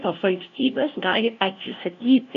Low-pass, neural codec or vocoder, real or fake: 7.2 kHz; codec, 16 kHz, 0.5 kbps, FunCodec, trained on LibriTTS, 25 frames a second; fake